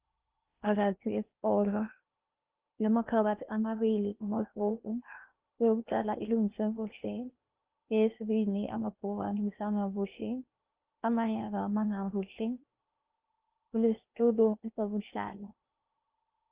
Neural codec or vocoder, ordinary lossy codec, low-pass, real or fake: codec, 16 kHz in and 24 kHz out, 0.8 kbps, FocalCodec, streaming, 65536 codes; Opus, 24 kbps; 3.6 kHz; fake